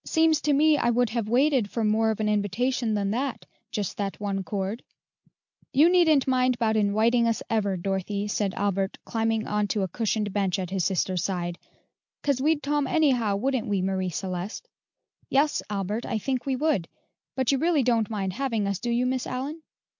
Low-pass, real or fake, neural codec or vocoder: 7.2 kHz; real; none